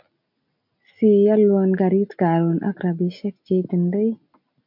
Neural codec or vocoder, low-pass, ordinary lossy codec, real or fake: none; 5.4 kHz; MP3, 48 kbps; real